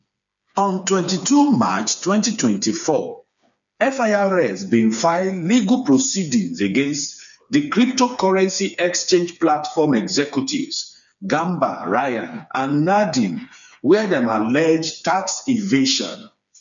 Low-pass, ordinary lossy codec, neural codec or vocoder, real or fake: 7.2 kHz; none; codec, 16 kHz, 4 kbps, FreqCodec, smaller model; fake